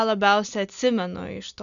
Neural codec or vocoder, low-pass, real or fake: none; 7.2 kHz; real